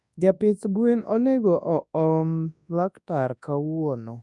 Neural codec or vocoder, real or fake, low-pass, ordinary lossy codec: codec, 24 kHz, 0.9 kbps, WavTokenizer, large speech release; fake; 10.8 kHz; none